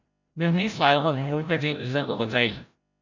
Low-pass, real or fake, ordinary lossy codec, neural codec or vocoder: 7.2 kHz; fake; MP3, 64 kbps; codec, 16 kHz, 0.5 kbps, FreqCodec, larger model